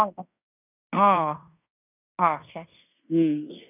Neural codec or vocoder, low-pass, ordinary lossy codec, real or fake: codec, 16 kHz in and 24 kHz out, 0.9 kbps, LongCat-Audio-Codec, fine tuned four codebook decoder; 3.6 kHz; none; fake